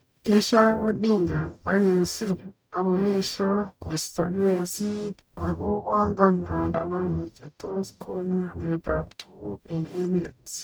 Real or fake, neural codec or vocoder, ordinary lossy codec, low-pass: fake; codec, 44.1 kHz, 0.9 kbps, DAC; none; none